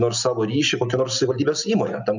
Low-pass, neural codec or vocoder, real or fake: 7.2 kHz; none; real